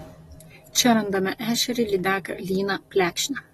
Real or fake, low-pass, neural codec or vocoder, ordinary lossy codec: real; 19.8 kHz; none; AAC, 32 kbps